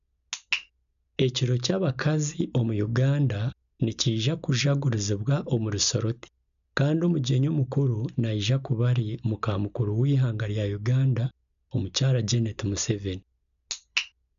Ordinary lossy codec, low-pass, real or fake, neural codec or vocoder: none; 7.2 kHz; real; none